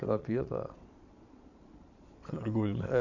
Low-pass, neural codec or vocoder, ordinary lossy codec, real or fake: 7.2 kHz; vocoder, 22.05 kHz, 80 mel bands, Vocos; none; fake